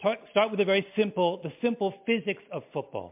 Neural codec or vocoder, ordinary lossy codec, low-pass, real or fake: none; MP3, 32 kbps; 3.6 kHz; real